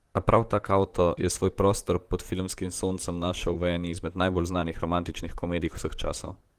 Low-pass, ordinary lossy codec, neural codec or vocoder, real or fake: 14.4 kHz; Opus, 24 kbps; vocoder, 44.1 kHz, 128 mel bands, Pupu-Vocoder; fake